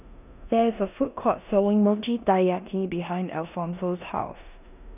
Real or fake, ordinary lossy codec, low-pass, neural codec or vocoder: fake; none; 3.6 kHz; codec, 16 kHz in and 24 kHz out, 0.9 kbps, LongCat-Audio-Codec, four codebook decoder